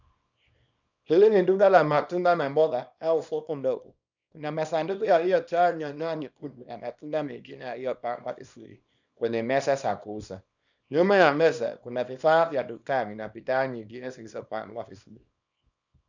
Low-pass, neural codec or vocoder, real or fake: 7.2 kHz; codec, 24 kHz, 0.9 kbps, WavTokenizer, small release; fake